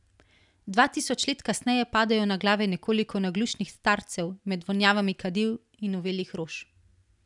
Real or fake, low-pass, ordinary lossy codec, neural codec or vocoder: real; 10.8 kHz; none; none